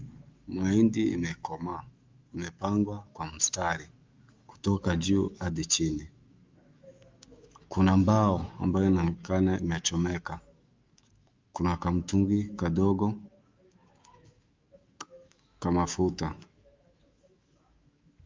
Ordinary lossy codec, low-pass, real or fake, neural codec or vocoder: Opus, 24 kbps; 7.2 kHz; fake; autoencoder, 48 kHz, 128 numbers a frame, DAC-VAE, trained on Japanese speech